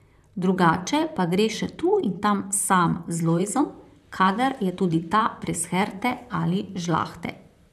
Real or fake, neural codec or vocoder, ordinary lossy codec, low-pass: fake; vocoder, 44.1 kHz, 128 mel bands, Pupu-Vocoder; none; 14.4 kHz